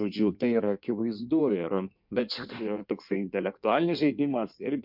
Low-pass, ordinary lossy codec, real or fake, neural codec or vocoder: 5.4 kHz; MP3, 48 kbps; fake; codec, 16 kHz in and 24 kHz out, 1.1 kbps, FireRedTTS-2 codec